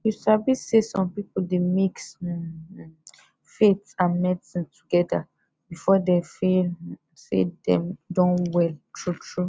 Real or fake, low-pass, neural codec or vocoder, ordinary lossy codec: real; none; none; none